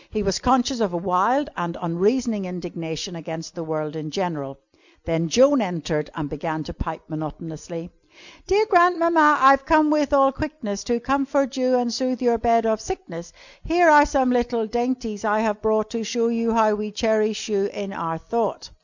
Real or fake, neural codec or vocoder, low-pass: real; none; 7.2 kHz